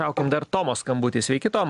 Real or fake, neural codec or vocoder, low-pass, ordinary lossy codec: real; none; 10.8 kHz; AAC, 96 kbps